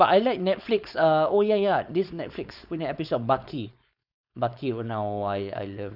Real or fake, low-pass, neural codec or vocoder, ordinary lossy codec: fake; 5.4 kHz; codec, 16 kHz, 4.8 kbps, FACodec; none